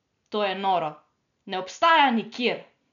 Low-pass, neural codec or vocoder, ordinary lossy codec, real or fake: 7.2 kHz; none; none; real